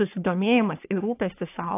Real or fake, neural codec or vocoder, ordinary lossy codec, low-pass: fake; codec, 44.1 kHz, 3.4 kbps, Pupu-Codec; AAC, 24 kbps; 3.6 kHz